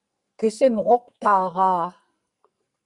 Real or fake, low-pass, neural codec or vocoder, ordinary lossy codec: fake; 10.8 kHz; codec, 44.1 kHz, 2.6 kbps, SNAC; Opus, 64 kbps